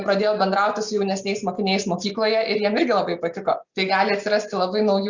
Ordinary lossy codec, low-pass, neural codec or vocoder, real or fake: Opus, 64 kbps; 7.2 kHz; none; real